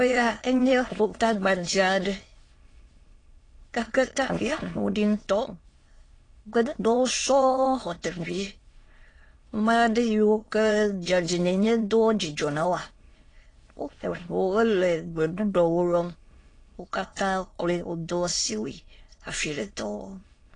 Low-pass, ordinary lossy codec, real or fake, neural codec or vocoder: 9.9 kHz; AAC, 32 kbps; fake; autoencoder, 22.05 kHz, a latent of 192 numbers a frame, VITS, trained on many speakers